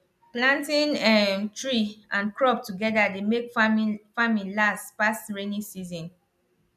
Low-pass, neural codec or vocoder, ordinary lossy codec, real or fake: 14.4 kHz; none; none; real